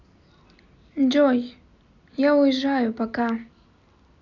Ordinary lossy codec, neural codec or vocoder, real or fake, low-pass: none; none; real; 7.2 kHz